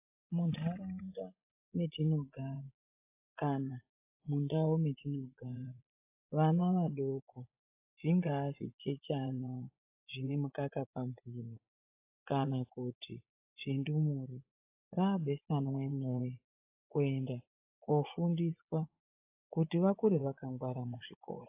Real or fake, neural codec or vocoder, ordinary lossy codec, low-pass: fake; vocoder, 24 kHz, 100 mel bands, Vocos; AAC, 32 kbps; 3.6 kHz